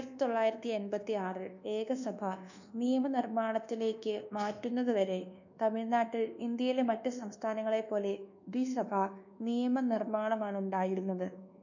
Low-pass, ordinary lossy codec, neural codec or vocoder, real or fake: 7.2 kHz; none; codec, 24 kHz, 1.2 kbps, DualCodec; fake